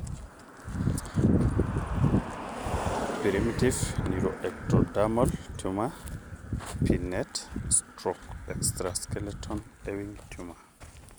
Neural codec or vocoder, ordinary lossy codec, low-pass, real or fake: none; none; none; real